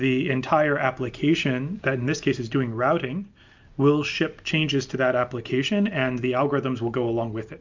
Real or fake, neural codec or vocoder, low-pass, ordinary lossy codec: real; none; 7.2 kHz; MP3, 64 kbps